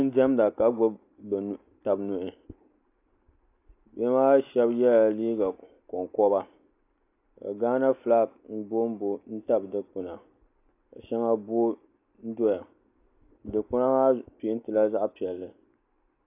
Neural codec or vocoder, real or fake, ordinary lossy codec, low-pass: none; real; AAC, 24 kbps; 3.6 kHz